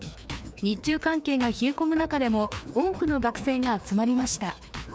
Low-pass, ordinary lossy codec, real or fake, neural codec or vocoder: none; none; fake; codec, 16 kHz, 2 kbps, FreqCodec, larger model